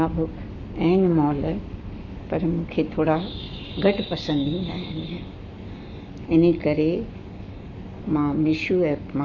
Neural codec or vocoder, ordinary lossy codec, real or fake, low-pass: codec, 44.1 kHz, 7.8 kbps, Pupu-Codec; none; fake; 7.2 kHz